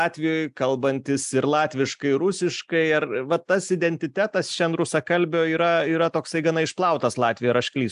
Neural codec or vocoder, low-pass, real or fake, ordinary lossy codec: none; 10.8 kHz; real; AAC, 96 kbps